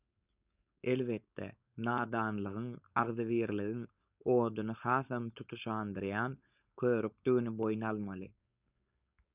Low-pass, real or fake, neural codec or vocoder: 3.6 kHz; fake; codec, 16 kHz, 4.8 kbps, FACodec